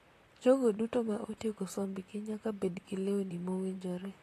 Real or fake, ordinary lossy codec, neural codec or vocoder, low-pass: fake; AAC, 48 kbps; vocoder, 44.1 kHz, 128 mel bands, Pupu-Vocoder; 14.4 kHz